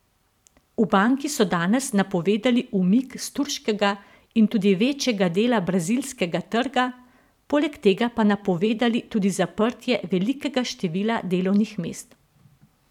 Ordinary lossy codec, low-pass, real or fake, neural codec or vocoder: none; 19.8 kHz; fake; vocoder, 44.1 kHz, 128 mel bands every 512 samples, BigVGAN v2